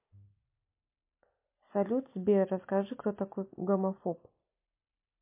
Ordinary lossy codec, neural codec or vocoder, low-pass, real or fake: MP3, 24 kbps; none; 3.6 kHz; real